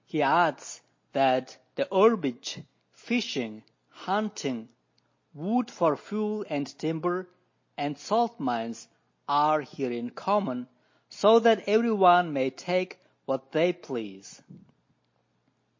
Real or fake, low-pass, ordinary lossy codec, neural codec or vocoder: real; 7.2 kHz; MP3, 32 kbps; none